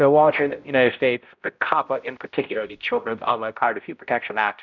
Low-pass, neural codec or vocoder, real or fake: 7.2 kHz; codec, 16 kHz, 0.5 kbps, X-Codec, HuBERT features, trained on balanced general audio; fake